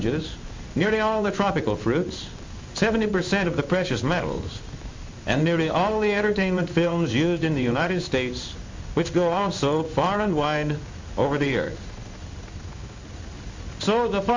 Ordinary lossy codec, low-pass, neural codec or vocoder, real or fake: MP3, 64 kbps; 7.2 kHz; codec, 16 kHz in and 24 kHz out, 1 kbps, XY-Tokenizer; fake